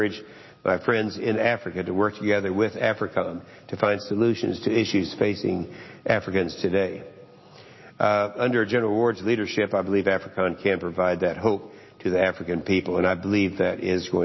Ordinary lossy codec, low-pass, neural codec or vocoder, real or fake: MP3, 24 kbps; 7.2 kHz; none; real